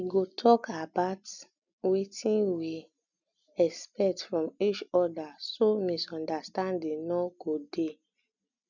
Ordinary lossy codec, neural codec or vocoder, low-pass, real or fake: none; none; 7.2 kHz; real